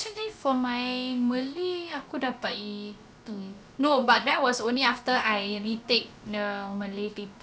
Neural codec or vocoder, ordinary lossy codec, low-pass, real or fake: codec, 16 kHz, about 1 kbps, DyCAST, with the encoder's durations; none; none; fake